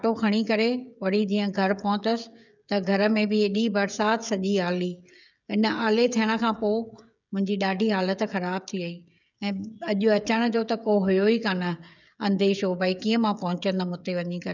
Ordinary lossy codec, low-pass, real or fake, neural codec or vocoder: none; 7.2 kHz; fake; codec, 16 kHz, 16 kbps, FreqCodec, smaller model